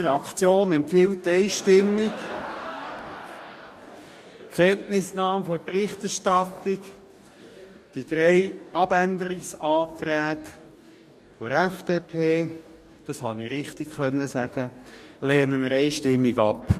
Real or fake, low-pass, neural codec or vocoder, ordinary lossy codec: fake; 14.4 kHz; codec, 44.1 kHz, 2.6 kbps, DAC; MP3, 64 kbps